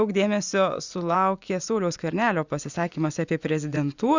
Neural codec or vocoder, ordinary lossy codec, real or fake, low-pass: vocoder, 24 kHz, 100 mel bands, Vocos; Opus, 64 kbps; fake; 7.2 kHz